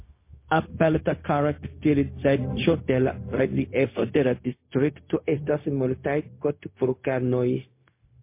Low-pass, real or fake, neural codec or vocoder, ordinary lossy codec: 3.6 kHz; fake; codec, 16 kHz, 0.4 kbps, LongCat-Audio-Codec; MP3, 24 kbps